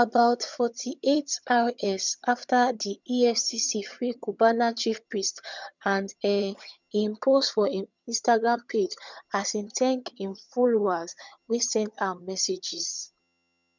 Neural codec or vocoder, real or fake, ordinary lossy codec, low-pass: vocoder, 22.05 kHz, 80 mel bands, HiFi-GAN; fake; none; 7.2 kHz